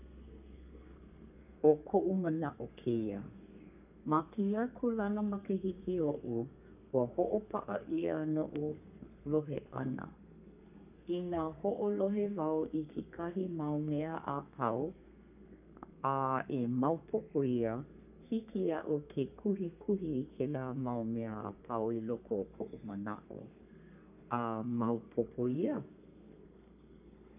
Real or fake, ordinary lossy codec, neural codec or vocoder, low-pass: fake; none; codec, 32 kHz, 1.9 kbps, SNAC; 3.6 kHz